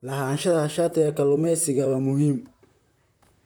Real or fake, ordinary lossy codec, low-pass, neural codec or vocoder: fake; none; none; vocoder, 44.1 kHz, 128 mel bands, Pupu-Vocoder